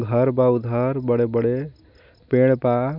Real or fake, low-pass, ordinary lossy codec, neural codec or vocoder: real; 5.4 kHz; none; none